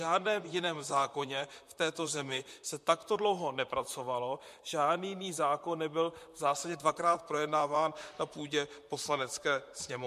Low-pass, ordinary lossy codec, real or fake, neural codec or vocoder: 14.4 kHz; MP3, 64 kbps; fake; vocoder, 44.1 kHz, 128 mel bands, Pupu-Vocoder